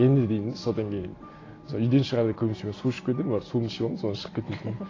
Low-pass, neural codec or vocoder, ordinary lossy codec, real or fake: 7.2 kHz; none; AAC, 32 kbps; real